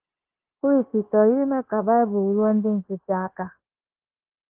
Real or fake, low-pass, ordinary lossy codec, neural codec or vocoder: fake; 3.6 kHz; Opus, 16 kbps; codec, 16 kHz, 0.9 kbps, LongCat-Audio-Codec